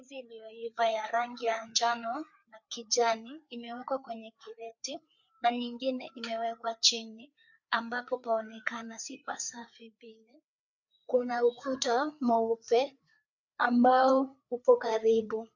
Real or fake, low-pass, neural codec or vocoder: fake; 7.2 kHz; codec, 16 kHz, 4 kbps, FreqCodec, larger model